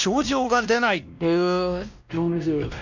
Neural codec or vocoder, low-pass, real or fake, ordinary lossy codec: codec, 16 kHz, 1 kbps, X-Codec, WavLM features, trained on Multilingual LibriSpeech; 7.2 kHz; fake; none